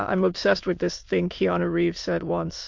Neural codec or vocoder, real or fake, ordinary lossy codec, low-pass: autoencoder, 22.05 kHz, a latent of 192 numbers a frame, VITS, trained on many speakers; fake; MP3, 48 kbps; 7.2 kHz